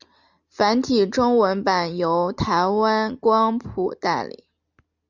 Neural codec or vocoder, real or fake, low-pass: none; real; 7.2 kHz